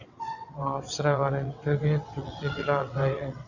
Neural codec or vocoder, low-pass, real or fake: vocoder, 22.05 kHz, 80 mel bands, WaveNeXt; 7.2 kHz; fake